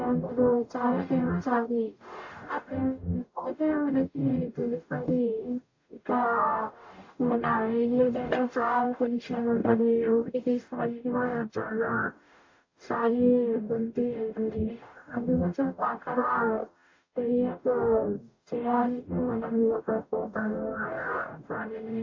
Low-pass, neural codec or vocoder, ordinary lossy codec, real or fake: 7.2 kHz; codec, 44.1 kHz, 0.9 kbps, DAC; AAC, 48 kbps; fake